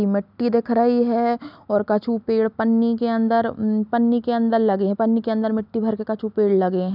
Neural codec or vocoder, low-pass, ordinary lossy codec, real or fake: none; 5.4 kHz; none; real